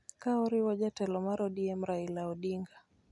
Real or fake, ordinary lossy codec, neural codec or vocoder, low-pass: real; none; none; 10.8 kHz